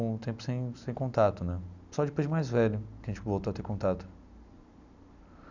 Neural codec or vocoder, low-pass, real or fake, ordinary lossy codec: none; 7.2 kHz; real; none